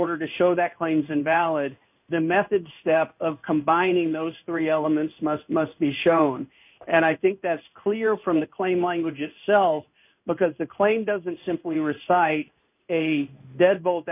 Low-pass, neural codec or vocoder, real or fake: 3.6 kHz; codec, 16 kHz in and 24 kHz out, 1 kbps, XY-Tokenizer; fake